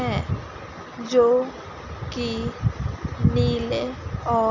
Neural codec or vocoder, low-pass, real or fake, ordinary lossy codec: none; 7.2 kHz; real; none